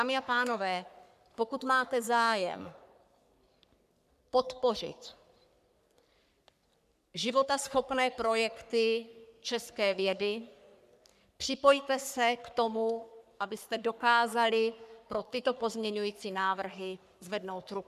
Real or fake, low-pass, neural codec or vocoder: fake; 14.4 kHz; codec, 44.1 kHz, 3.4 kbps, Pupu-Codec